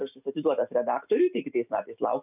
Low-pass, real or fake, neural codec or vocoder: 3.6 kHz; real; none